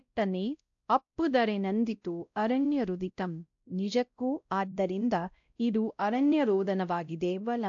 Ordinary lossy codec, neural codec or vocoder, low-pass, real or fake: AAC, 64 kbps; codec, 16 kHz, 0.5 kbps, X-Codec, WavLM features, trained on Multilingual LibriSpeech; 7.2 kHz; fake